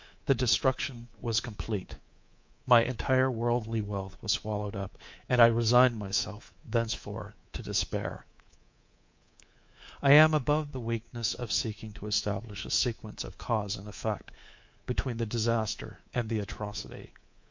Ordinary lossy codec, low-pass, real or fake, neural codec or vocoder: MP3, 48 kbps; 7.2 kHz; fake; codec, 24 kHz, 3.1 kbps, DualCodec